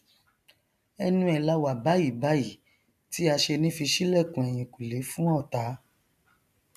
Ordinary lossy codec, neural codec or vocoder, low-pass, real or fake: none; none; 14.4 kHz; real